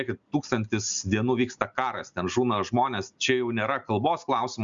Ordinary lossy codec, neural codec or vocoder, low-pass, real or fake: Opus, 64 kbps; none; 7.2 kHz; real